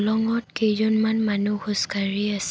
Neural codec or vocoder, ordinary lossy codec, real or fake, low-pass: none; none; real; none